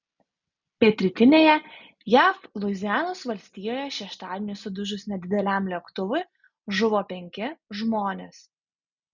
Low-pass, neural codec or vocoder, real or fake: 7.2 kHz; none; real